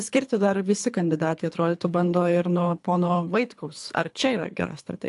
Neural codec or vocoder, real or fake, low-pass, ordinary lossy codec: codec, 24 kHz, 3 kbps, HILCodec; fake; 10.8 kHz; AAC, 64 kbps